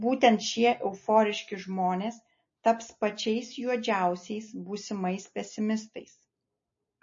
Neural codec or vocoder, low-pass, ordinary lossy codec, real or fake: none; 7.2 kHz; MP3, 32 kbps; real